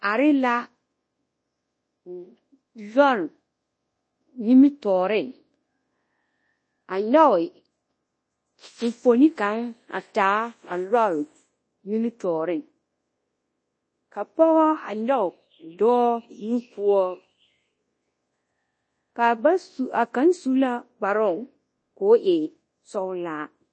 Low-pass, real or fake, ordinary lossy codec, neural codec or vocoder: 9.9 kHz; fake; MP3, 32 kbps; codec, 24 kHz, 0.9 kbps, WavTokenizer, large speech release